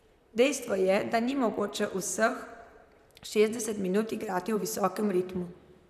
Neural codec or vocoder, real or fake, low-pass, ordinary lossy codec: vocoder, 44.1 kHz, 128 mel bands, Pupu-Vocoder; fake; 14.4 kHz; none